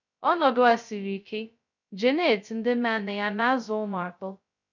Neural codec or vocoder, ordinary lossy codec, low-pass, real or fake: codec, 16 kHz, 0.2 kbps, FocalCodec; none; 7.2 kHz; fake